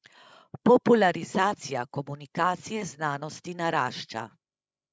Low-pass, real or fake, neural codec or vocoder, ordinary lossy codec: none; fake; codec, 16 kHz, 16 kbps, FreqCodec, larger model; none